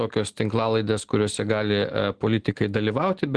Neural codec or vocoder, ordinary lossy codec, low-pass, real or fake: none; Opus, 16 kbps; 10.8 kHz; real